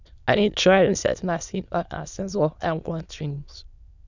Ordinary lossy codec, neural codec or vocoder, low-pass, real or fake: none; autoencoder, 22.05 kHz, a latent of 192 numbers a frame, VITS, trained on many speakers; 7.2 kHz; fake